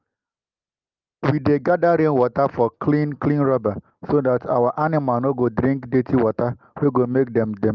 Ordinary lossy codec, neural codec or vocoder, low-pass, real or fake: Opus, 24 kbps; none; 7.2 kHz; real